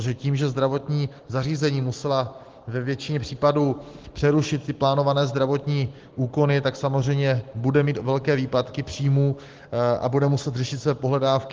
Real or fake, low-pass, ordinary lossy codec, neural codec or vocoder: real; 7.2 kHz; Opus, 16 kbps; none